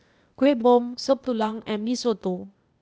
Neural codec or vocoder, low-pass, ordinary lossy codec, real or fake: codec, 16 kHz, 0.8 kbps, ZipCodec; none; none; fake